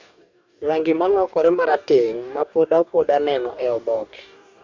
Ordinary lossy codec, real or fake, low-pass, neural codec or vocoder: MP3, 48 kbps; fake; 7.2 kHz; codec, 44.1 kHz, 2.6 kbps, DAC